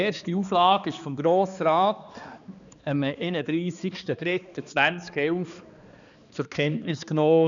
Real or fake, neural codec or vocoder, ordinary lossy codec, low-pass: fake; codec, 16 kHz, 2 kbps, X-Codec, HuBERT features, trained on balanced general audio; none; 7.2 kHz